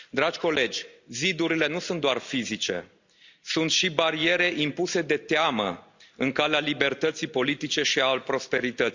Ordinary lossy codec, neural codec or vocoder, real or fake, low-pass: Opus, 64 kbps; none; real; 7.2 kHz